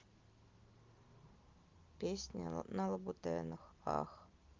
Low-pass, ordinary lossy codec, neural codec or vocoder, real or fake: 7.2 kHz; Opus, 32 kbps; none; real